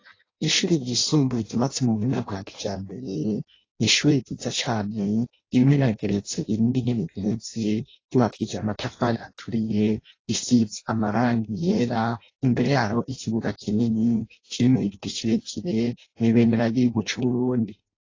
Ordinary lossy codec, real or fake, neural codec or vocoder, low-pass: AAC, 32 kbps; fake; codec, 16 kHz in and 24 kHz out, 0.6 kbps, FireRedTTS-2 codec; 7.2 kHz